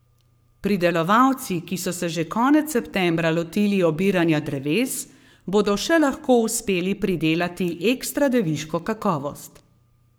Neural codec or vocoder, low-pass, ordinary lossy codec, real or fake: codec, 44.1 kHz, 7.8 kbps, Pupu-Codec; none; none; fake